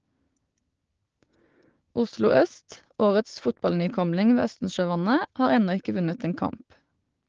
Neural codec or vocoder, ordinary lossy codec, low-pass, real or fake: codec, 16 kHz, 6 kbps, DAC; Opus, 16 kbps; 7.2 kHz; fake